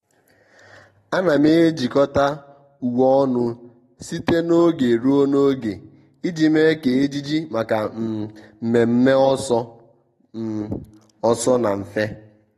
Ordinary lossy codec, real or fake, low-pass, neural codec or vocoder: AAC, 32 kbps; real; 19.8 kHz; none